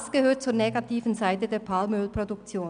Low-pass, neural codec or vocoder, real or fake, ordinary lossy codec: 9.9 kHz; none; real; none